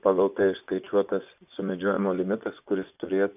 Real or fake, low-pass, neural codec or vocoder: fake; 3.6 kHz; vocoder, 24 kHz, 100 mel bands, Vocos